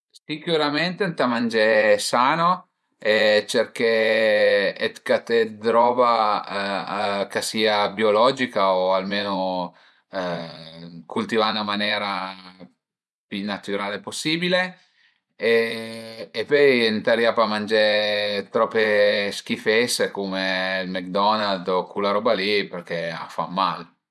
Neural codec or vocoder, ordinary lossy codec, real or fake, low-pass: vocoder, 24 kHz, 100 mel bands, Vocos; none; fake; none